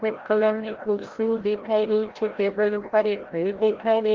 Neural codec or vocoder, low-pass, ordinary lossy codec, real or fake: codec, 16 kHz, 0.5 kbps, FreqCodec, larger model; 7.2 kHz; Opus, 16 kbps; fake